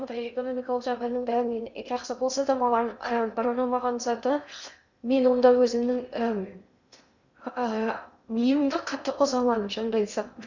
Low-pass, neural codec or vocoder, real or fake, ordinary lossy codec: 7.2 kHz; codec, 16 kHz in and 24 kHz out, 0.6 kbps, FocalCodec, streaming, 2048 codes; fake; none